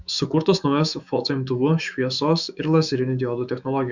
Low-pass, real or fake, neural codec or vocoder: 7.2 kHz; fake; autoencoder, 48 kHz, 128 numbers a frame, DAC-VAE, trained on Japanese speech